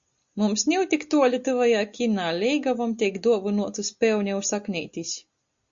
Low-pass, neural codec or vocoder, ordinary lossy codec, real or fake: 7.2 kHz; none; Opus, 64 kbps; real